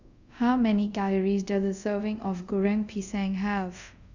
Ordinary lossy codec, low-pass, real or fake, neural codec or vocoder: none; 7.2 kHz; fake; codec, 24 kHz, 0.5 kbps, DualCodec